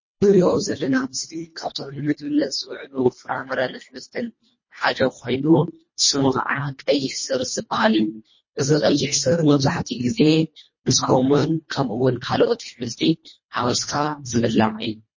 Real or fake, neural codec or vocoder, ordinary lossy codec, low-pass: fake; codec, 24 kHz, 1.5 kbps, HILCodec; MP3, 32 kbps; 7.2 kHz